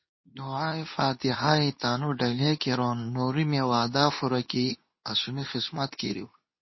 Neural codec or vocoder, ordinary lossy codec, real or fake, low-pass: codec, 24 kHz, 0.9 kbps, WavTokenizer, medium speech release version 2; MP3, 24 kbps; fake; 7.2 kHz